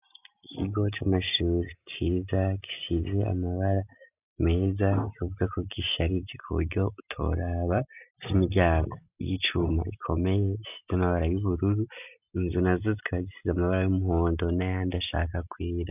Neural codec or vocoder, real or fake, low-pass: none; real; 3.6 kHz